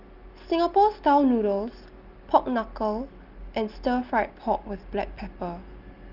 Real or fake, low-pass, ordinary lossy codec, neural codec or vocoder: real; 5.4 kHz; Opus, 32 kbps; none